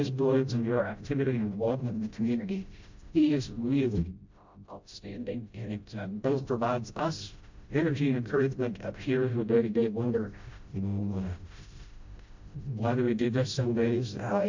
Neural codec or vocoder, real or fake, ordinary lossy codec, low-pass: codec, 16 kHz, 0.5 kbps, FreqCodec, smaller model; fake; MP3, 48 kbps; 7.2 kHz